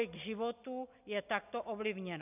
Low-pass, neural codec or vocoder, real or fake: 3.6 kHz; none; real